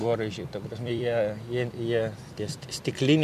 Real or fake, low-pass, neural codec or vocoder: fake; 14.4 kHz; vocoder, 44.1 kHz, 128 mel bands every 256 samples, BigVGAN v2